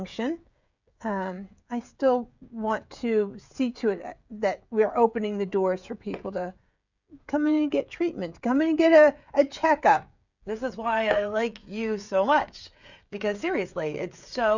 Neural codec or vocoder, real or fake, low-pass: codec, 16 kHz, 16 kbps, FreqCodec, smaller model; fake; 7.2 kHz